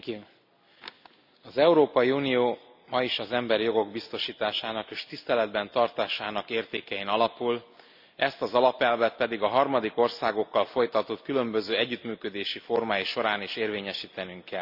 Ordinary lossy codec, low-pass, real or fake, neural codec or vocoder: none; 5.4 kHz; real; none